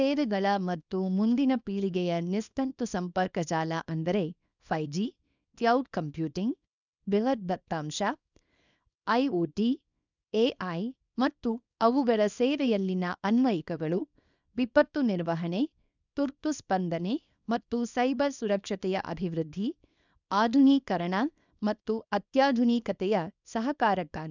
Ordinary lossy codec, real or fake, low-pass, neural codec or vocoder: none; fake; 7.2 kHz; codec, 24 kHz, 0.9 kbps, WavTokenizer, small release